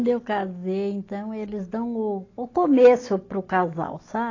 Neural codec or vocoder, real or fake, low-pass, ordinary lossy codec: none; real; 7.2 kHz; AAC, 32 kbps